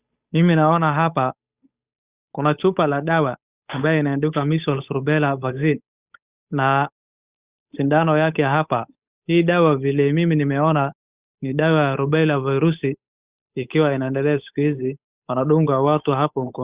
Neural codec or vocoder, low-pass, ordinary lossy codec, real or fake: codec, 16 kHz, 8 kbps, FunCodec, trained on Chinese and English, 25 frames a second; 3.6 kHz; Opus, 64 kbps; fake